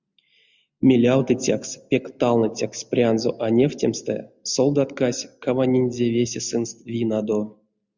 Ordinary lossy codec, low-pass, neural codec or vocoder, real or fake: Opus, 64 kbps; 7.2 kHz; none; real